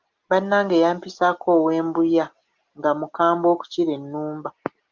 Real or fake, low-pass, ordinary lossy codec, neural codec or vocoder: real; 7.2 kHz; Opus, 24 kbps; none